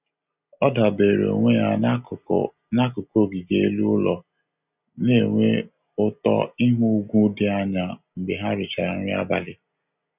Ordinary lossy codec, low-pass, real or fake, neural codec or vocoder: none; 3.6 kHz; real; none